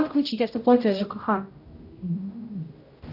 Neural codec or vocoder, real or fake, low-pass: codec, 16 kHz, 0.5 kbps, X-Codec, HuBERT features, trained on general audio; fake; 5.4 kHz